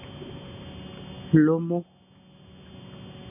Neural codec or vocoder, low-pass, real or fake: none; 3.6 kHz; real